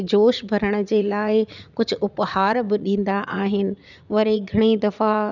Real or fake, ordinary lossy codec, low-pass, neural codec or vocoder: fake; none; 7.2 kHz; vocoder, 44.1 kHz, 80 mel bands, Vocos